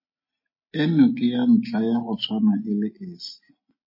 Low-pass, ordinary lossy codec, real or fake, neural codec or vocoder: 5.4 kHz; MP3, 24 kbps; real; none